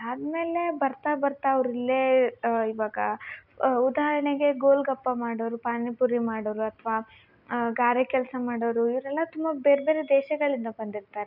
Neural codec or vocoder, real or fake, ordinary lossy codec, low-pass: none; real; none; 5.4 kHz